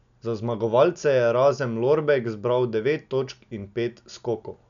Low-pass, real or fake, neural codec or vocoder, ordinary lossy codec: 7.2 kHz; real; none; none